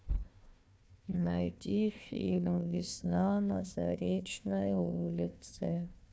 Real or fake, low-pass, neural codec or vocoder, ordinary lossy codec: fake; none; codec, 16 kHz, 1 kbps, FunCodec, trained on Chinese and English, 50 frames a second; none